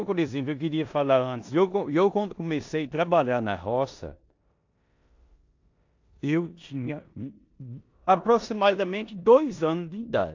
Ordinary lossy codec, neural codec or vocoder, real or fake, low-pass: AAC, 48 kbps; codec, 16 kHz in and 24 kHz out, 0.9 kbps, LongCat-Audio-Codec, four codebook decoder; fake; 7.2 kHz